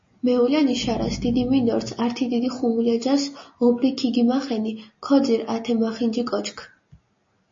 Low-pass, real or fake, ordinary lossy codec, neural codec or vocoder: 7.2 kHz; real; MP3, 32 kbps; none